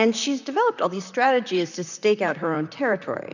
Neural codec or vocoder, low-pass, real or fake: vocoder, 44.1 kHz, 128 mel bands, Pupu-Vocoder; 7.2 kHz; fake